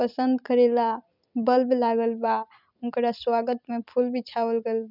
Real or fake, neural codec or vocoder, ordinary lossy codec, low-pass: fake; vocoder, 44.1 kHz, 128 mel bands every 256 samples, BigVGAN v2; none; 5.4 kHz